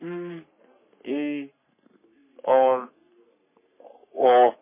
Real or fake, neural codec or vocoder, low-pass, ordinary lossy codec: fake; codec, 44.1 kHz, 3.4 kbps, Pupu-Codec; 3.6 kHz; MP3, 16 kbps